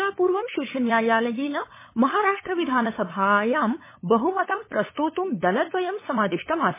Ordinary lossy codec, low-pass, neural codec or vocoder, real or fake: MP3, 16 kbps; 3.6 kHz; codec, 16 kHz, 8 kbps, FunCodec, trained on LibriTTS, 25 frames a second; fake